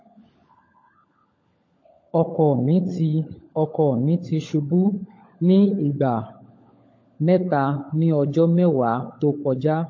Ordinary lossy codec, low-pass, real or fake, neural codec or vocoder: MP3, 32 kbps; 7.2 kHz; fake; codec, 16 kHz, 16 kbps, FunCodec, trained on LibriTTS, 50 frames a second